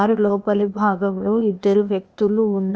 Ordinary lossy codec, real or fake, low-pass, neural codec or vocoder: none; fake; none; codec, 16 kHz, 0.8 kbps, ZipCodec